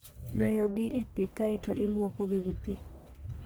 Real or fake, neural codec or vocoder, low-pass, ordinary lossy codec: fake; codec, 44.1 kHz, 1.7 kbps, Pupu-Codec; none; none